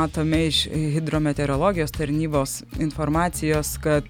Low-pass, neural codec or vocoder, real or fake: 19.8 kHz; none; real